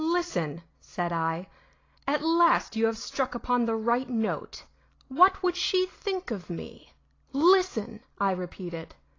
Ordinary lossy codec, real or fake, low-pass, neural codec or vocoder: AAC, 32 kbps; real; 7.2 kHz; none